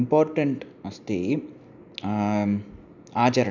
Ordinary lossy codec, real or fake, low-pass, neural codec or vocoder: none; real; 7.2 kHz; none